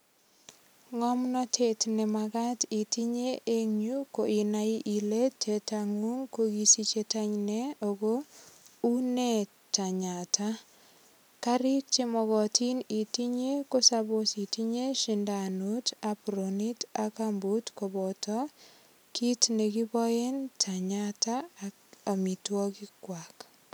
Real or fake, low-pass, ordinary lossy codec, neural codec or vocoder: real; none; none; none